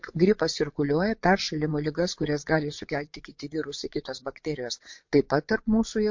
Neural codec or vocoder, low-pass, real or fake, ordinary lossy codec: vocoder, 22.05 kHz, 80 mel bands, Vocos; 7.2 kHz; fake; MP3, 48 kbps